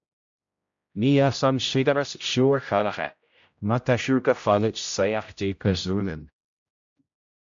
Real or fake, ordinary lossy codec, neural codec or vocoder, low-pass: fake; MP3, 64 kbps; codec, 16 kHz, 0.5 kbps, X-Codec, HuBERT features, trained on general audio; 7.2 kHz